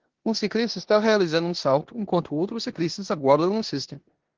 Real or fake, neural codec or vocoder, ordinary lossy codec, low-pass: fake; codec, 16 kHz in and 24 kHz out, 0.9 kbps, LongCat-Audio-Codec, four codebook decoder; Opus, 16 kbps; 7.2 kHz